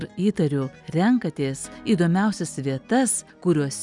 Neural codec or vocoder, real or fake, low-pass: none; real; 10.8 kHz